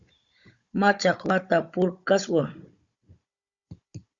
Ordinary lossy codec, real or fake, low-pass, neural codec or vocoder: Opus, 64 kbps; fake; 7.2 kHz; codec, 16 kHz, 16 kbps, FunCodec, trained on Chinese and English, 50 frames a second